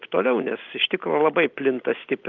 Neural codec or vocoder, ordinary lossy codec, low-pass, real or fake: none; Opus, 32 kbps; 7.2 kHz; real